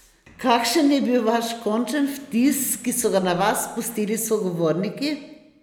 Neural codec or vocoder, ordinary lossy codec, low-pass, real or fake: none; none; 19.8 kHz; real